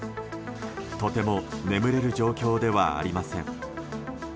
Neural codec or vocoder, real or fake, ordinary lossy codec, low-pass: none; real; none; none